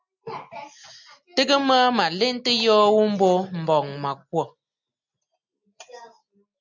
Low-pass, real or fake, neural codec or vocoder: 7.2 kHz; real; none